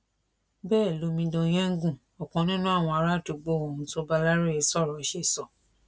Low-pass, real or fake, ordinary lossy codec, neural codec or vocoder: none; real; none; none